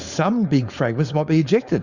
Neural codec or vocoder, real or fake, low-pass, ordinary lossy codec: codec, 16 kHz, 4.8 kbps, FACodec; fake; 7.2 kHz; Opus, 64 kbps